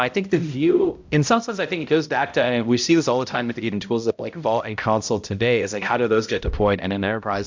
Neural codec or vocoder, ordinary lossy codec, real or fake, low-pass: codec, 16 kHz, 0.5 kbps, X-Codec, HuBERT features, trained on balanced general audio; MP3, 64 kbps; fake; 7.2 kHz